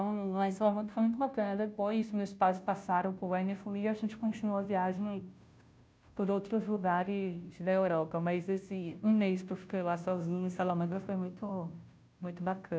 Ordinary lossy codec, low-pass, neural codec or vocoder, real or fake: none; none; codec, 16 kHz, 0.5 kbps, FunCodec, trained on Chinese and English, 25 frames a second; fake